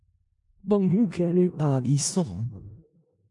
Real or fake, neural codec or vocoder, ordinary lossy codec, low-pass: fake; codec, 16 kHz in and 24 kHz out, 0.4 kbps, LongCat-Audio-Codec, four codebook decoder; MP3, 64 kbps; 10.8 kHz